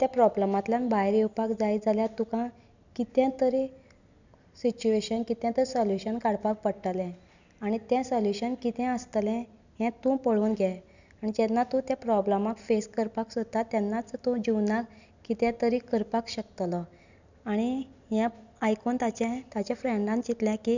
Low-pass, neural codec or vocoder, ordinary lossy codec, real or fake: 7.2 kHz; none; none; real